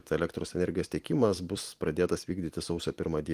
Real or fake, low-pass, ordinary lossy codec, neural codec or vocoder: fake; 14.4 kHz; Opus, 24 kbps; vocoder, 44.1 kHz, 128 mel bands every 256 samples, BigVGAN v2